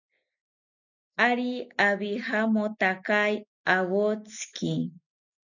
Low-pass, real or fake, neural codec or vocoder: 7.2 kHz; real; none